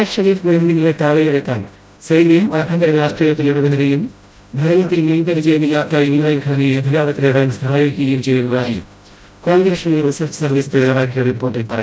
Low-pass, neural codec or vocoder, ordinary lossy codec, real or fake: none; codec, 16 kHz, 0.5 kbps, FreqCodec, smaller model; none; fake